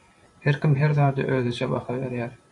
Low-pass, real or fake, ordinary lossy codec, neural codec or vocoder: 10.8 kHz; fake; AAC, 64 kbps; vocoder, 44.1 kHz, 128 mel bands every 512 samples, BigVGAN v2